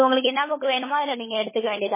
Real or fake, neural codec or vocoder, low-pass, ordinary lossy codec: fake; codec, 16 kHz, 16 kbps, FunCodec, trained on LibriTTS, 50 frames a second; 3.6 kHz; MP3, 16 kbps